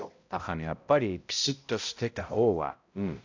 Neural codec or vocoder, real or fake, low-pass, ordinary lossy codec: codec, 16 kHz, 0.5 kbps, X-Codec, HuBERT features, trained on balanced general audio; fake; 7.2 kHz; AAC, 48 kbps